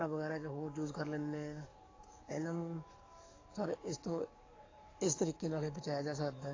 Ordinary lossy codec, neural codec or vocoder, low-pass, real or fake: AAC, 32 kbps; codec, 44.1 kHz, 7.8 kbps, DAC; 7.2 kHz; fake